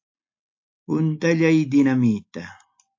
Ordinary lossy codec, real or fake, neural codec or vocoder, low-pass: AAC, 48 kbps; real; none; 7.2 kHz